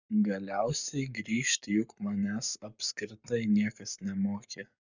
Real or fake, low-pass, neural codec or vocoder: fake; 7.2 kHz; codec, 44.1 kHz, 7.8 kbps, Pupu-Codec